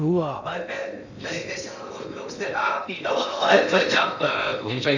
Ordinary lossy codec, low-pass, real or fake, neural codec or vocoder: none; 7.2 kHz; fake; codec, 16 kHz in and 24 kHz out, 0.6 kbps, FocalCodec, streaming, 2048 codes